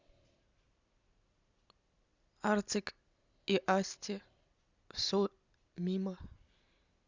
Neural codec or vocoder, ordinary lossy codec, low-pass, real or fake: none; Opus, 64 kbps; 7.2 kHz; real